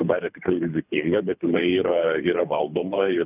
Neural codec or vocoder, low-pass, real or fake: codec, 24 kHz, 3 kbps, HILCodec; 3.6 kHz; fake